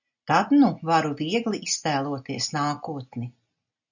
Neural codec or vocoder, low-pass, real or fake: none; 7.2 kHz; real